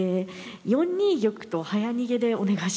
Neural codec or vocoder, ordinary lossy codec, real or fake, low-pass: none; none; real; none